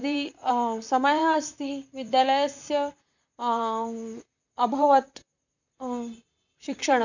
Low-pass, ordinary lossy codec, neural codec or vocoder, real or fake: 7.2 kHz; none; vocoder, 44.1 kHz, 128 mel bands, Pupu-Vocoder; fake